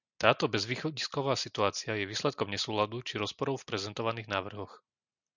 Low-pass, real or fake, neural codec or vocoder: 7.2 kHz; real; none